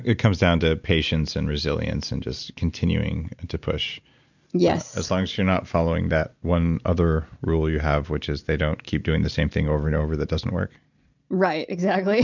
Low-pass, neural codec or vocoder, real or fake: 7.2 kHz; none; real